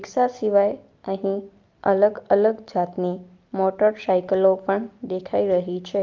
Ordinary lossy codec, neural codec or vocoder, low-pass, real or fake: Opus, 32 kbps; none; 7.2 kHz; real